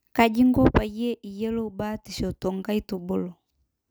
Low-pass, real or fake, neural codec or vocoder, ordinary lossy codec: none; real; none; none